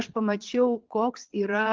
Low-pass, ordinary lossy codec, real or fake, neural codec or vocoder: 7.2 kHz; Opus, 32 kbps; fake; vocoder, 22.05 kHz, 80 mel bands, Vocos